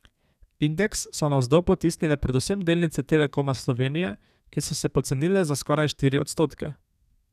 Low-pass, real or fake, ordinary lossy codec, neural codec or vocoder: 14.4 kHz; fake; none; codec, 32 kHz, 1.9 kbps, SNAC